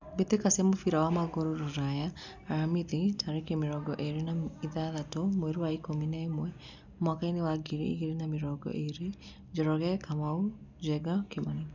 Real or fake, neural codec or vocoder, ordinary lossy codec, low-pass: real; none; none; 7.2 kHz